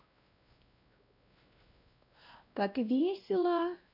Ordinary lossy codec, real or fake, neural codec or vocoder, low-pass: none; fake; codec, 16 kHz, 1 kbps, X-Codec, WavLM features, trained on Multilingual LibriSpeech; 5.4 kHz